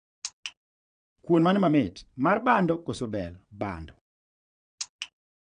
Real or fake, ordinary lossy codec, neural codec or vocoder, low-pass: fake; none; vocoder, 22.05 kHz, 80 mel bands, Vocos; 9.9 kHz